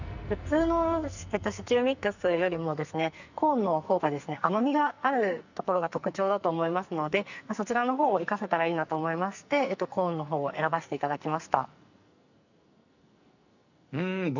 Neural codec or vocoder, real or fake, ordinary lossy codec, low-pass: codec, 44.1 kHz, 2.6 kbps, SNAC; fake; none; 7.2 kHz